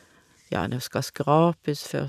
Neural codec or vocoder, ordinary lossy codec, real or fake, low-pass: none; none; real; 14.4 kHz